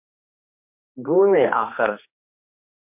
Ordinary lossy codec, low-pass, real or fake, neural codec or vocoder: MP3, 32 kbps; 3.6 kHz; fake; codec, 16 kHz, 2 kbps, X-Codec, HuBERT features, trained on general audio